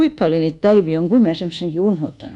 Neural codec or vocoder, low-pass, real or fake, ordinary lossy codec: codec, 24 kHz, 1.2 kbps, DualCodec; 10.8 kHz; fake; none